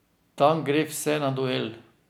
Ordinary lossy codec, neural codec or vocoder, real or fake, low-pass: none; none; real; none